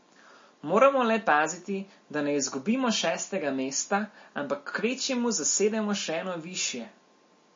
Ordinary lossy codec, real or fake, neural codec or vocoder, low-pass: MP3, 32 kbps; real; none; 7.2 kHz